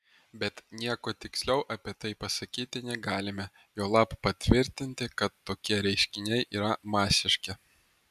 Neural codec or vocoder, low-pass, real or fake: none; 14.4 kHz; real